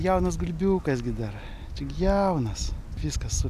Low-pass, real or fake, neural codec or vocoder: 14.4 kHz; real; none